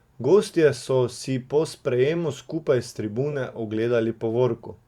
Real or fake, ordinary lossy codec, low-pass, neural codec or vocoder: fake; Opus, 64 kbps; 19.8 kHz; vocoder, 44.1 kHz, 128 mel bands every 512 samples, BigVGAN v2